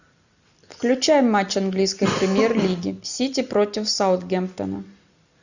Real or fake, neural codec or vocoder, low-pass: real; none; 7.2 kHz